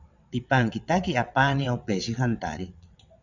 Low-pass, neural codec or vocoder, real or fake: 7.2 kHz; vocoder, 22.05 kHz, 80 mel bands, WaveNeXt; fake